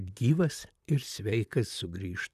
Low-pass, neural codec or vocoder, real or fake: 14.4 kHz; vocoder, 44.1 kHz, 128 mel bands, Pupu-Vocoder; fake